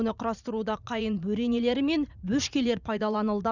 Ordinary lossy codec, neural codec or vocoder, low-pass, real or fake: none; vocoder, 44.1 kHz, 80 mel bands, Vocos; 7.2 kHz; fake